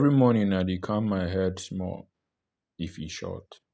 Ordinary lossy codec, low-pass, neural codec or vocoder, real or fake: none; none; none; real